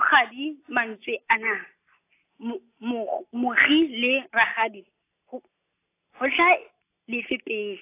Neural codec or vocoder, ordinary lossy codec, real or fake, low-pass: none; AAC, 24 kbps; real; 3.6 kHz